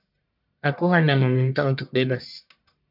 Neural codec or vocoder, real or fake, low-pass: codec, 44.1 kHz, 1.7 kbps, Pupu-Codec; fake; 5.4 kHz